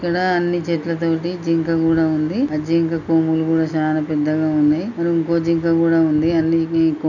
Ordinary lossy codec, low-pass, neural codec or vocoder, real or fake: none; 7.2 kHz; none; real